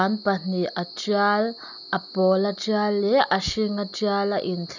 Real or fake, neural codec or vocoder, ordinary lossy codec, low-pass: real; none; none; 7.2 kHz